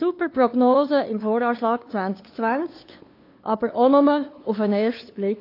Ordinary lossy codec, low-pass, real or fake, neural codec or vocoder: AAC, 32 kbps; 5.4 kHz; fake; codec, 16 kHz, 2 kbps, FunCodec, trained on LibriTTS, 25 frames a second